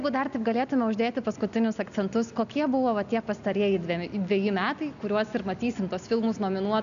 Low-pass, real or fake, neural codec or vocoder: 7.2 kHz; real; none